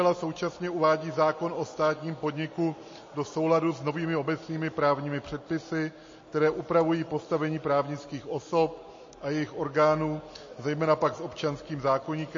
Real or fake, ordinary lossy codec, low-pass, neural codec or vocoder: real; MP3, 32 kbps; 7.2 kHz; none